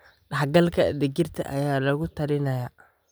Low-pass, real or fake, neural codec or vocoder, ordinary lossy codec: none; real; none; none